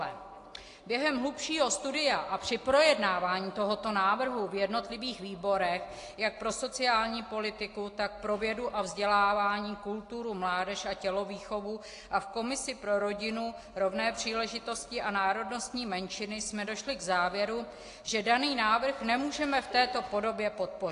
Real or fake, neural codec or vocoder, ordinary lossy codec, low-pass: real; none; AAC, 48 kbps; 10.8 kHz